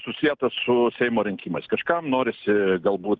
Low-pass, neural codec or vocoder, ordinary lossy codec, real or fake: 7.2 kHz; none; Opus, 24 kbps; real